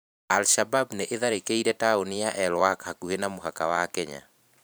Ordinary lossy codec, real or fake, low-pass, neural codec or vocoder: none; fake; none; vocoder, 44.1 kHz, 128 mel bands every 512 samples, BigVGAN v2